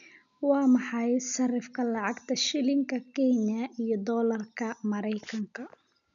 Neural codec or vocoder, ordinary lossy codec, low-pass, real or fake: none; none; 7.2 kHz; real